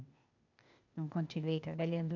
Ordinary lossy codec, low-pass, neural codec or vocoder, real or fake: none; 7.2 kHz; codec, 16 kHz, 0.8 kbps, ZipCodec; fake